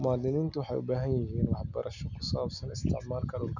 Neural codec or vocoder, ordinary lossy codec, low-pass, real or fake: none; none; 7.2 kHz; real